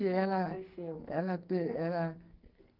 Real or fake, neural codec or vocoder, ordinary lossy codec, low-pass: fake; codec, 44.1 kHz, 2.6 kbps, SNAC; Opus, 32 kbps; 5.4 kHz